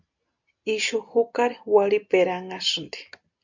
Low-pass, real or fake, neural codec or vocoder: 7.2 kHz; real; none